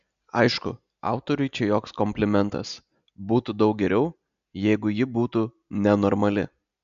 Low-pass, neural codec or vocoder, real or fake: 7.2 kHz; none; real